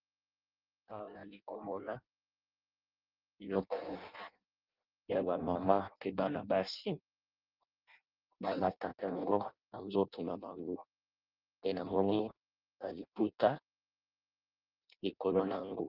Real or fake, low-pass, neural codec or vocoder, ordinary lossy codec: fake; 5.4 kHz; codec, 16 kHz in and 24 kHz out, 0.6 kbps, FireRedTTS-2 codec; Opus, 24 kbps